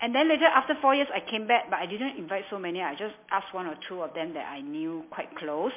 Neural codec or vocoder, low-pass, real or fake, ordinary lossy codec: none; 3.6 kHz; real; MP3, 24 kbps